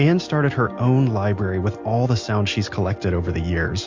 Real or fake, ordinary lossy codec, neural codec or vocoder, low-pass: real; MP3, 48 kbps; none; 7.2 kHz